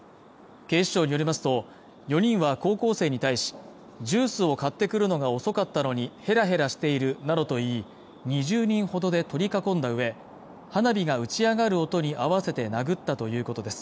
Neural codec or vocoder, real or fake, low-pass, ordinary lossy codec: none; real; none; none